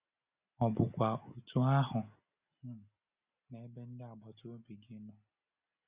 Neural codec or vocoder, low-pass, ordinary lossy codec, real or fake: none; 3.6 kHz; Opus, 64 kbps; real